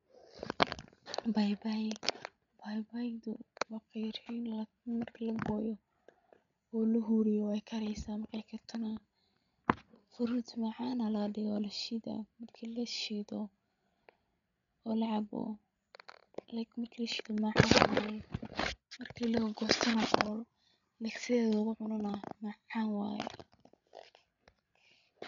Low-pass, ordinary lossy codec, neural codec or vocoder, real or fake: 7.2 kHz; none; codec, 16 kHz, 16 kbps, FreqCodec, larger model; fake